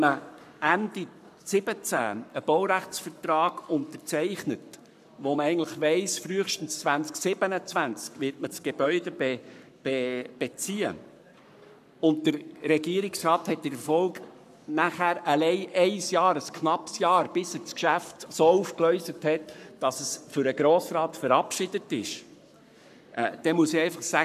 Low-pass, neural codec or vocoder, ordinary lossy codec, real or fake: 14.4 kHz; codec, 44.1 kHz, 7.8 kbps, Pupu-Codec; none; fake